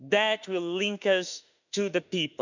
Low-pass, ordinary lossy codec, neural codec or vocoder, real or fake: 7.2 kHz; none; autoencoder, 48 kHz, 32 numbers a frame, DAC-VAE, trained on Japanese speech; fake